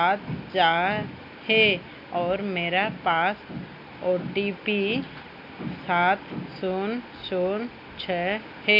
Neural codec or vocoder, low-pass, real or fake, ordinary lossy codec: none; 5.4 kHz; real; none